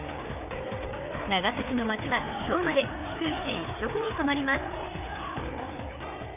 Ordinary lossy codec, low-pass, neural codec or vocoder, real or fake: none; 3.6 kHz; codec, 16 kHz, 4 kbps, FreqCodec, larger model; fake